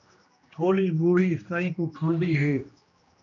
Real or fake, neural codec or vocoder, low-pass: fake; codec, 16 kHz, 1 kbps, X-Codec, HuBERT features, trained on general audio; 7.2 kHz